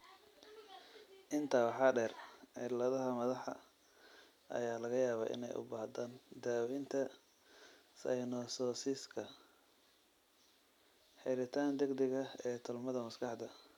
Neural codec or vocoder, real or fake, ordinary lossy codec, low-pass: none; real; none; 19.8 kHz